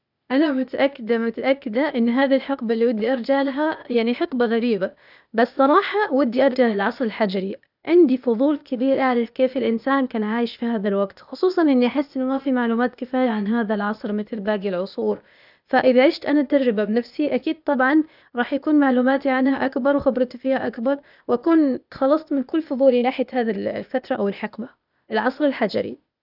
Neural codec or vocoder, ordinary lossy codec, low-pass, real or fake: codec, 16 kHz, 0.8 kbps, ZipCodec; none; 5.4 kHz; fake